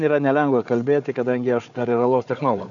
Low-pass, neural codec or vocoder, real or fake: 7.2 kHz; codec, 16 kHz, 4 kbps, FunCodec, trained on Chinese and English, 50 frames a second; fake